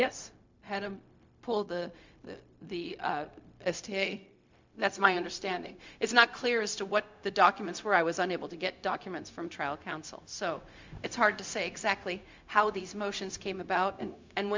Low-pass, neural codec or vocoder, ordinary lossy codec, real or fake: 7.2 kHz; codec, 16 kHz, 0.4 kbps, LongCat-Audio-Codec; MP3, 64 kbps; fake